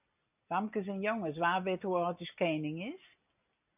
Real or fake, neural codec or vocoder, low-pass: real; none; 3.6 kHz